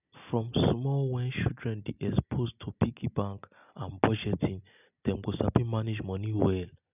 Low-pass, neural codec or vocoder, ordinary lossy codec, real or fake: 3.6 kHz; none; none; real